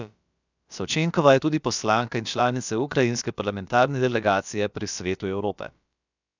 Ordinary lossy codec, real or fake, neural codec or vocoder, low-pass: none; fake; codec, 16 kHz, about 1 kbps, DyCAST, with the encoder's durations; 7.2 kHz